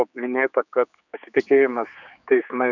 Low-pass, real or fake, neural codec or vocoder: 7.2 kHz; fake; codec, 16 kHz, 4 kbps, X-Codec, HuBERT features, trained on general audio